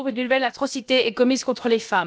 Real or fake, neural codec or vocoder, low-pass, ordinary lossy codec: fake; codec, 16 kHz, about 1 kbps, DyCAST, with the encoder's durations; none; none